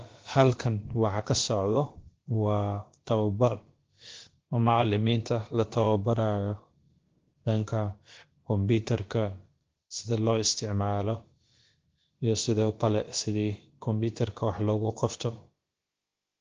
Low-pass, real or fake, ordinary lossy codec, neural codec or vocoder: 7.2 kHz; fake; Opus, 16 kbps; codec, 16 kHz, about 1 kbps, DyCAST, with the encoder's durations